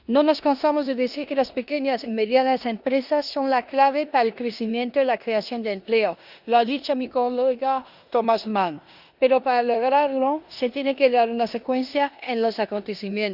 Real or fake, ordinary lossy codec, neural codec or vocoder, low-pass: fake; Opus, 64 kbps; codec, 16 kHz in and 24 kHz out, 0.9 kbps, LongCat-Audio-Codec, four codebook decoder; 5.4 kHz